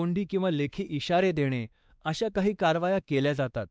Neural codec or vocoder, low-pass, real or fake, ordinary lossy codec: codec, 16 kHz, 2 kbps, X-Codec, WavLM features, trained on Multilingual LibriSpeech; none; fake; none